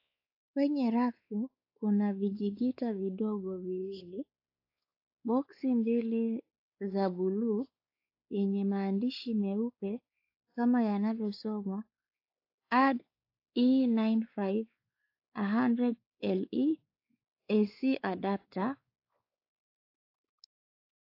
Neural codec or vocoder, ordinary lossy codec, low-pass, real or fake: codec, 16 kHz, 4 kbps, X-Codec, WavLM features, trained on Multilingual LibriSpeech; AAC, 32 kbps; 5.4 kHz; fake